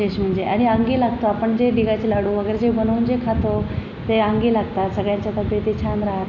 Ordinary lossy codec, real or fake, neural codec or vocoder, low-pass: AAC, 48 kbps; real; none; 7.2 kHz